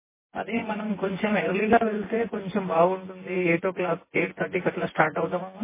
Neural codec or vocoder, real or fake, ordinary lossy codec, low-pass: vocoder, 24 kHz, 100 mel bands, Vocos; fake; MP3, 16 kbps; 3.6 kHz